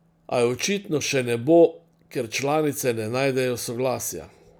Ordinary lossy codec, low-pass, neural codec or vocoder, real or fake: none; none; none; real